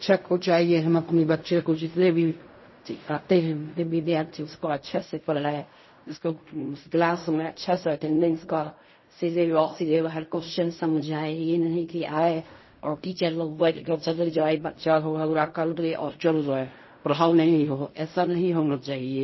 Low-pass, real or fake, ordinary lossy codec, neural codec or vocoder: 7.2 kHz; fake; MP3, 24 kbps; codec, 16 kHz in and 24 kHz out, 0.4 kbps, LongCat-Audio-Codec, fine tuned four codebook decoder